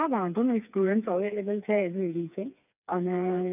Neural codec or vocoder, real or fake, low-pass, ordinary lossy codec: codec, 16 kHz, 4 kbps, FreqCodec, smaller model; fake; 3.6 kHz; none